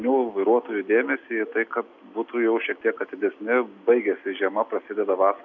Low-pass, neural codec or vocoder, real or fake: 7.2 kHz; none; real